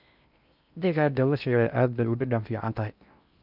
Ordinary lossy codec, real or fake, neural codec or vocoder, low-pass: none; fake; codec, 16 kHz in and 24 kHz out, 0.6 kbps, FocalCodec, streaming, 4096 codes; 5.4 kHz